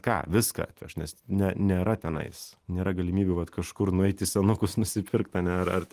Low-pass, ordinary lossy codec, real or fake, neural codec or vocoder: 14.4 kHz; Opus, 24 kbps; real; none